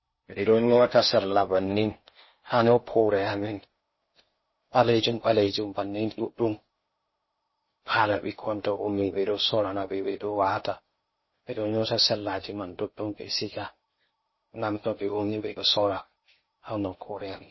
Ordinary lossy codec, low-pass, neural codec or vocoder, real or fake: MP3, 24 kbps; 7.2 kHz; codec, 16 kHz in and 24 kHz out, 0.6 kbps, FocalCodec, streaming, 4096 codes; fake